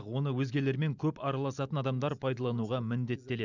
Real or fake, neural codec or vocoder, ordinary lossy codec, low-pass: real; none; none; 7.2 kHz